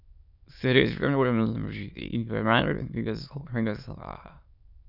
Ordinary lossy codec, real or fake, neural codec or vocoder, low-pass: none; fake; autoencoder, 22.05 kHz, a latent of 192 numbers a frame, VITS, trained on many speakers; 5.4 kHz